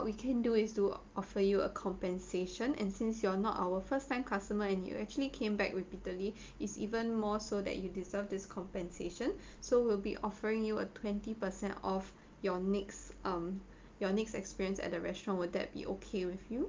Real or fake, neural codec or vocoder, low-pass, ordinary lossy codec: real; none; 7.2 kHz; Opus, 32 kbps